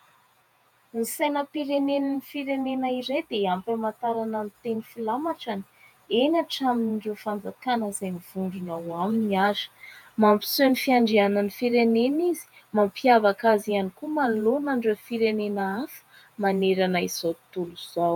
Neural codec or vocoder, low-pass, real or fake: vocoder, 48 kHz, 128 mel bands, Vocos; 19.8 kHz; fake